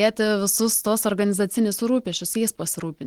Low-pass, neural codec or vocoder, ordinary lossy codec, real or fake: 19.8 kHz; none; Opus, 16 kbps; real